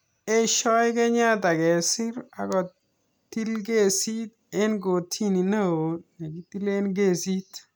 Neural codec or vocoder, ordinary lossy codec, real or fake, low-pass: none; none; real; none